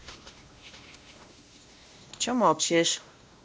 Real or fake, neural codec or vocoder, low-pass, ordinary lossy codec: fake; codec, 16 kHz, 1 kbps, X-Codec, WavLM features, trained on Multilingual LibriSpeech; none; none